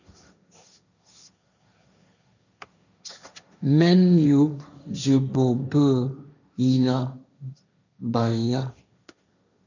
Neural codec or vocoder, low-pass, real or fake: codec, 16 kHz, 1.1 kbps, Voila-Tokenizer; 7.2 kHz; fake